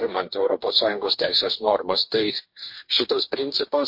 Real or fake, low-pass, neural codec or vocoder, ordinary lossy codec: fake; 5.4 kHz; codec, 44.1 kHz, 2.6 kbps, DAC; MP3, 32 kbps